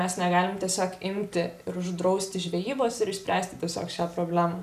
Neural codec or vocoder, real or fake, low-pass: none; real; 14.4 kHz